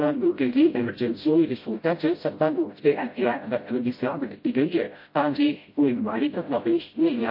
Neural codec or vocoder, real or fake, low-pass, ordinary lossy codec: codec, 16 kHz, 0.5 kbps, FreqCodec, smaller model; fake; 5.4 kHz; MP3, 32 kbps